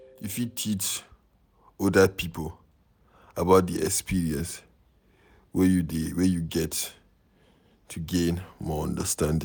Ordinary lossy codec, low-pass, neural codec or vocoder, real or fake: none; none; none; real